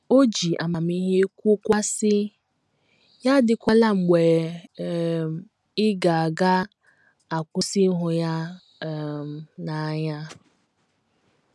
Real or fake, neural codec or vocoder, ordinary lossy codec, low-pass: real; none; none; none